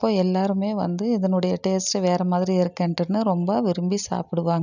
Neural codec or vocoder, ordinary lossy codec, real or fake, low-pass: none; none; real; 7.2 kHz